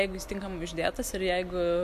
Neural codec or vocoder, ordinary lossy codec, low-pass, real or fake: none; MP3, 96 kbps; 14.4 kHz; real